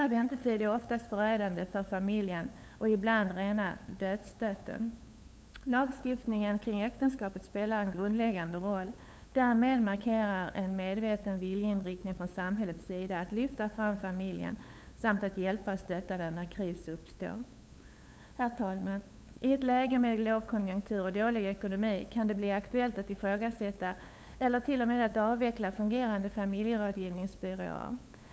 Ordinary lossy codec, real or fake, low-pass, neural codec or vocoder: none; fake; none; codec, 16 kHz, 8 kbps, FunCodec, trained on LibriTTS, 25 frames a second